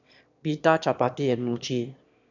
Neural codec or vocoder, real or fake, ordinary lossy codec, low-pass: autoencoder, 22.05 kHz, a latent of 192 numbers a frame, VITS, trained on one speaker; fake; none; 7.2 kHz